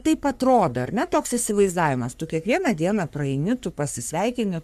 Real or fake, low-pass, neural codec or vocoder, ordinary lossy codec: fake; 14.4 kHz; codec, 44.1 kHz, 3.4 kbps, Pupu-Codec; AAC, 96 kbps